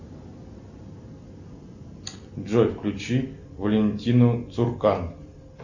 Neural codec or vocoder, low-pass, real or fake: none; 7.2 kHz; real